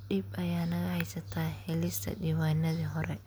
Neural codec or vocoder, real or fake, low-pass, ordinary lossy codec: none; real; none; none